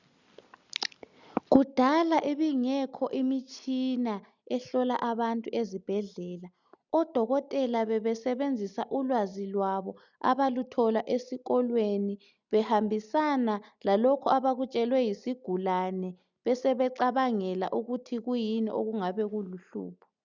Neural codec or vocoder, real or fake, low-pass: none; real; 7.2 kHz